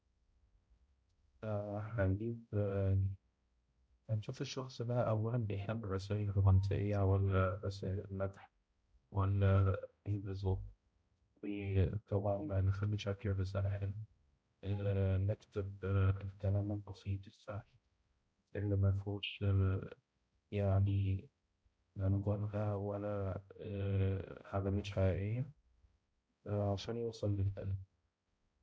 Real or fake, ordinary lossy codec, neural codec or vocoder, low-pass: fake; none; codec, 16 kHz, 0.5 kbps, X-Codec, HuBERT features, trained on balanced general audio; none